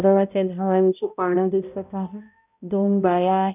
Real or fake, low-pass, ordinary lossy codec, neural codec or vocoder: fake; 3.6 kHz; none; codec, 16 kHz, 0.5 kbps, X-Codec, HuBERT features, trained on balanced general audio